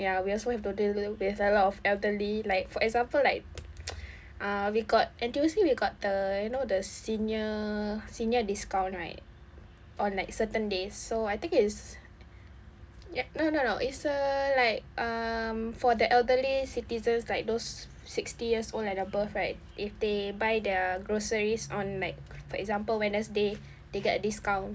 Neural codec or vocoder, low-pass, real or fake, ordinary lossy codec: none; none; real; none